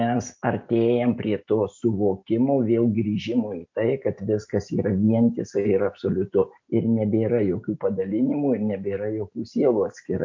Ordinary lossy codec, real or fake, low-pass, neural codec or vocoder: MP3, 48 kbps; real; 7.2 kHz; none